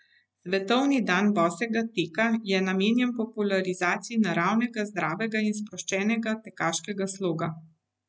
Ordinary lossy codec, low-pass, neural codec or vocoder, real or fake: none; none; none; real